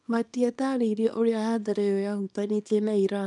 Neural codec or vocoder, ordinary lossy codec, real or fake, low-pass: codec, 24 kHz, 0.9 kbps, WavTokenizer, small release; none; fake; 10.8 kHz